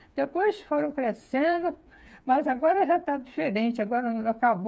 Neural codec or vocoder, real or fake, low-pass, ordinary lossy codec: codec, 16 kHz, 4 kbps, FreqCodec, smaller model; fake; none; none